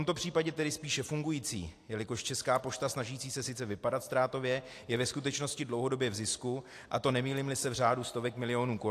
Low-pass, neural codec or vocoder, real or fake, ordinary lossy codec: 14.4 kHz; none; real; AAC, 64 kbps